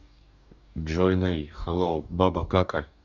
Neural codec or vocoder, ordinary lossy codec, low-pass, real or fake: codec, 44.1 kHz, 2.6 kbps, DAC; none; 7.2 kHz; fake